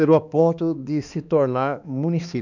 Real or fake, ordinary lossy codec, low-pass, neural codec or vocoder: fake; none; 7.2 kHz; codec, 16 kHz, 2 kbps, X-Codec, HuBERT features, trained on balanced general audio